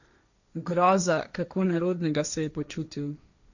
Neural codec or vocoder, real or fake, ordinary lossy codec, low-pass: codec, 16 kHz, 1.1 kbps, Voila-Tokenizer; fake; none; 7.2 kHz